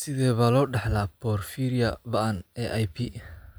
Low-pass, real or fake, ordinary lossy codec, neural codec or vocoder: none; real; none; none